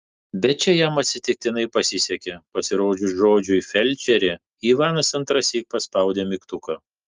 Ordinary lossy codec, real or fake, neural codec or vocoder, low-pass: Opus, 24 kbps; real; none; 7.2 kHz